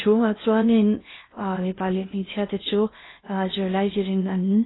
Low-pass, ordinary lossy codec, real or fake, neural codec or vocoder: 7.2 kHz; AAC, 16 kbps; fake; codec, 16 kHz in and 24 kHz out, 0.6 kbps, FocalCodec, streaming, 2048 codes